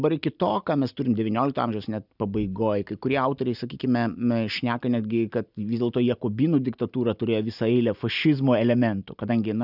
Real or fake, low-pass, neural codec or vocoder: real; 5.4 kHz; none